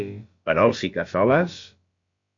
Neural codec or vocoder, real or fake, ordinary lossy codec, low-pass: codec, 16 kHz, about 1 kbps, DyCAST, with the encoder's durations; fake; MP3, 48 kbps; 7.2 kHz